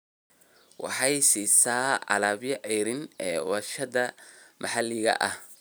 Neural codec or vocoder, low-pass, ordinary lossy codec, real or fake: none; none; none; real